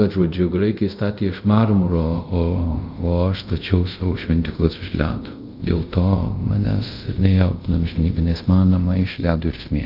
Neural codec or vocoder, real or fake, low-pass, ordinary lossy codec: codec, 24 kHz, 0.5 kbps, DualCodec; fake; 5.4 kHz; Opus, 24 kbps